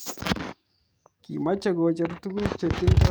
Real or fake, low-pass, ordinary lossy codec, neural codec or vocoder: fake; none; none; codec, 44.1 kHz, 7.8 kbps, DAC